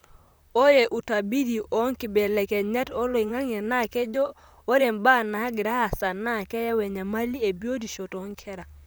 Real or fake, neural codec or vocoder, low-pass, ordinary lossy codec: fake; vocoder, 44.1 kHz, 128 mel bands, Pupu-Vocoder; none; none